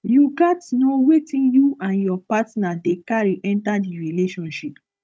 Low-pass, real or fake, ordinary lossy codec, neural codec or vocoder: none; fake; none; codec, 16 kHz, 16 kbps, FunCodec, trained on Chinese and English, 50 frames a second